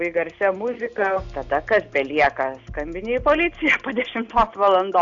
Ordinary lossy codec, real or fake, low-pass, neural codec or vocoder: AAC, 64 kbps; real; 7.2 kHz; none